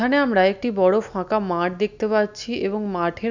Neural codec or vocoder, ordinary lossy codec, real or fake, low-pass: none; none; real; 7.2 kHz